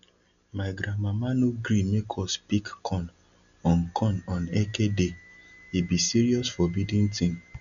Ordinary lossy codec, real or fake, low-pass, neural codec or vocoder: none; real; 7.2 kHz; none